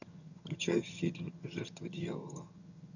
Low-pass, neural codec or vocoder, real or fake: 7.2 kHz; vocoder, 22.05 kHz, 80 mel bands, HiFi-GAN; fake